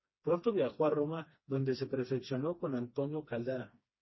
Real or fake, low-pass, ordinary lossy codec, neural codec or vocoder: fake; 7.2 kHz; MP3, 24 kbps; codec, 16 kHz, 2 kbps, FreqCodec, smaller model